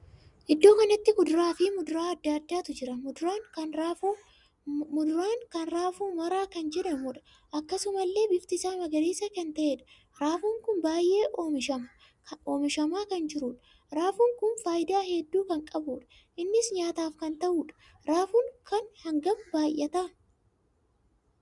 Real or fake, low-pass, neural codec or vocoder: real; 10.8 kHz; none